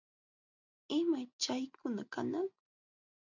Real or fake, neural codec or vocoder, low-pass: real; none; 7.2 kHz